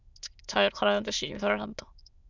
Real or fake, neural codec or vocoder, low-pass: fake; autoencoder, 22.05 kHz, a latent of 192 numbers a frame, VITS, trained on many speakers; 7.2 kHz